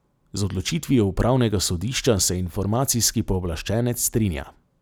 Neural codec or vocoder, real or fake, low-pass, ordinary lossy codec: none; real; none; none